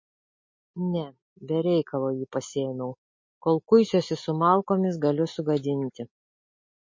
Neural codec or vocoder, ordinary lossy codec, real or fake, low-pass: none; MP3, 32 kbps; real; 7.2 kHz